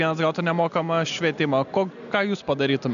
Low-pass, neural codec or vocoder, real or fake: 7.2 kHz; none; real